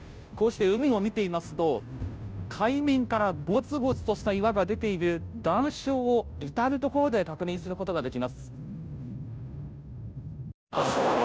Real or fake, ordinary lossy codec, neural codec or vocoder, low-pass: fake; none; codec, 16 kHz, 0.5 kbps, FunCodec, trained on Chinese and English, 25 frames a second; none